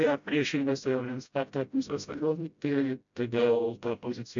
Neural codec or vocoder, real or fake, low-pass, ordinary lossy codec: codec, 16 kHz, 0.5 kbps, FreqCodec, smaller model; fake; 7.2 kHz; MP3, 64 kbps